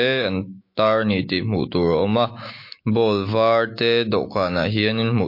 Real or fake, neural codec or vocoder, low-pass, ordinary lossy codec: fake; autoencoder, 48 kHz, 128 numbers a frame, DAC-VAE, trained on Japanese speech; 5.4 kHz; MP3, 32 kbps